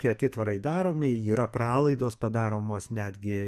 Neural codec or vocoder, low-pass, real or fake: codec, 44.1 kHz, 2.6 kbps, SNAC; 14.4 kHz; fake